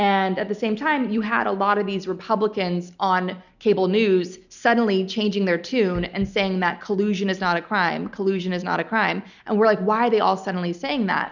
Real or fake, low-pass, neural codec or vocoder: real; 7.2 kHz; none